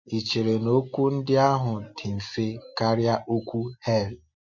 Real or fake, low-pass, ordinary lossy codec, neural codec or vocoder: real; 7.2 kHz; MP3, 48 kbps; none